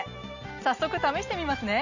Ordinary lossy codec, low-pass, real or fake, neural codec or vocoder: none; 7.2 kHz; real; none